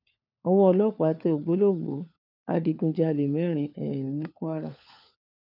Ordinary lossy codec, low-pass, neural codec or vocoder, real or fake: none; 5.4 kHz; codec, 16 kHz, 4 kbps, FunCodec, trained on LibriTTS, 50 frames a second; fake